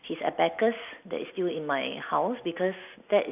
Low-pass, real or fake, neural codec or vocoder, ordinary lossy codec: 3.6 kHz; fake; vocoder, 44.1 kHz, 128 mel bands every 256 samples, BigVGAN v2; AAC, 32 kbps